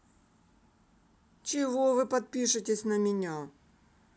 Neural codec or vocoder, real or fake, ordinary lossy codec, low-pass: none; real; none; none